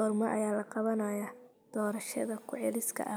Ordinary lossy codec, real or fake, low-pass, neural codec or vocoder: none; real; none; none